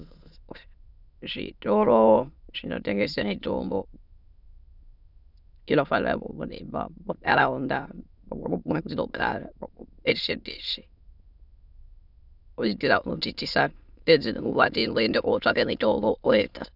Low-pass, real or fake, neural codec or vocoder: 5.4 kHz; fake; autoencoder, 22.05 kHz, a latent of 192 numbers a frame, VITS, trained on many speakers